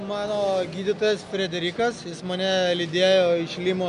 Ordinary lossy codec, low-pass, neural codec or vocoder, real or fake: MP3, 64 kbps; 10.8 kHz; none; real